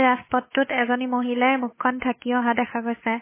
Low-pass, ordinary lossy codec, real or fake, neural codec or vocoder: 3.6 kHz; MP3, 16 kbps; fake; codec, 16 kHz, 8 kbps, FunCodec, trained on Chinese and English, 25 frames a second